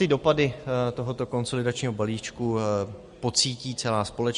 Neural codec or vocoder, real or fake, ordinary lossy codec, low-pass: none; real; MP3, 48 kbps; 14.4 kHz